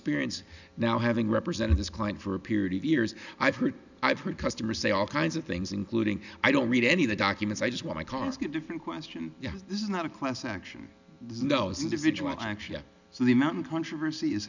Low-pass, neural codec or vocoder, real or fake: 7.2 kHz; none; real